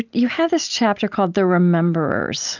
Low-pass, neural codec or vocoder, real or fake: 7.2 kHz; none; real